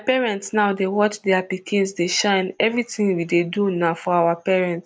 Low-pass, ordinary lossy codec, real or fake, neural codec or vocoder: none; none; real; none